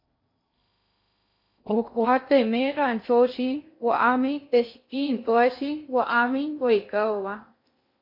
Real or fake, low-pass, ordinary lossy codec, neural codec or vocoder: fake; 5.4 kHz; MP3, 32 kbps; codec, 16 kHz in and 24 kHz out, 0.6 kbps, FocalCodec, streaming, 2048 codes